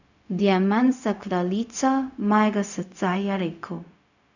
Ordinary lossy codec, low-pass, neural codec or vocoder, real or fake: none; 7.2 kHz; codec, 16 kHz, 0.4 kbps, LongCat-Audio-Codec; fake